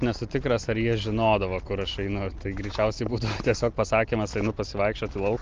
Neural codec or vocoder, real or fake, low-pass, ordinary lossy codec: none; real; 7.2 kHz; Opus, 32 kbps